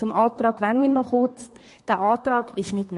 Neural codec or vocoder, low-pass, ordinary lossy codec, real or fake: codec, 24 kHz, 1 kbps, SNAC; 10.8 kHz; MP3, 48 kbps; fake